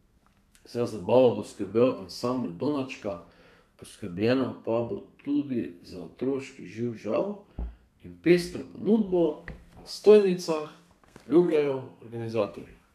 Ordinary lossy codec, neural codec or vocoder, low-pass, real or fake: none; codec, 32 kHz, 1.9 kbps, SNAC; 14.4 kHz; fake